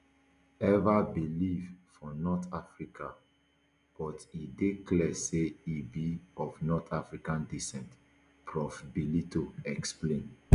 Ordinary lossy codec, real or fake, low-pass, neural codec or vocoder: none; real; 10.8 kHz; none